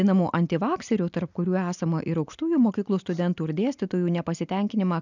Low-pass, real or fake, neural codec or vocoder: 7.2 kHz; real; none